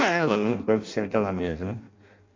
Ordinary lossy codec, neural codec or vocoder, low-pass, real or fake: MP3, 48 kbps; codec, 16 kHz in and 24 kHz out, 0.6 kbps, FireRedTTS-2 codec; 7.2 kHz; fake